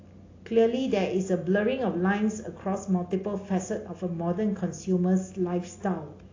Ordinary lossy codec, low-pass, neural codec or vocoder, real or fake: AAC, 32 kbps; 7.2 kHz; none; real